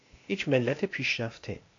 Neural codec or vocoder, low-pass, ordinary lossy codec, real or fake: codec, 16 kHz, 0.8 kbps, ZipCodec; 7.2 kHz; AAC, 48 kbps; fake